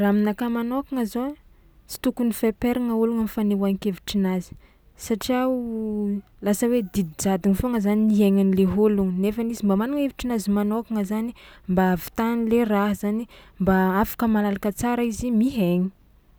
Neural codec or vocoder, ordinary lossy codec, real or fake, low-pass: none; none; real; none